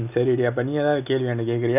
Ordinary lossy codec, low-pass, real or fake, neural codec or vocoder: none; 3.6 kHz; real; none